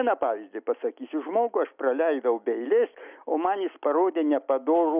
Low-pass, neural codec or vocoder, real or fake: 3.6 kHz; none; real